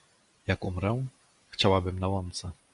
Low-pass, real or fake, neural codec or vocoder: 10.8 kHz; real; none